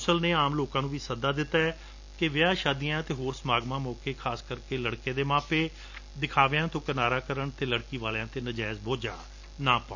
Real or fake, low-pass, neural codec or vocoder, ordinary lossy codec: real; 7.2 kHz; none; none